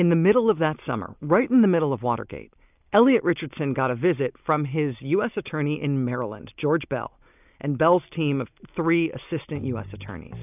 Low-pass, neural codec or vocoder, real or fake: 3.6 kHz; none; real